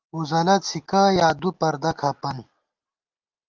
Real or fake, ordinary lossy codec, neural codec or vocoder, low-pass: real; Opus, 24 kbps; none; 7.2 kHz